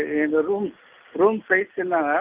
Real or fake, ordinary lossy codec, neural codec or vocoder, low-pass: real; Opus, 32 kbps; none; 3.6 kHz